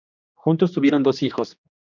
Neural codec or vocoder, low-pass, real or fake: codec, 16 kHz, 2 kbps, X-Codec, HuBERT features, trained on general audio; 7.2 kHz; fake